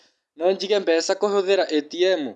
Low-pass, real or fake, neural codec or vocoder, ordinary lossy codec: none; real; none; none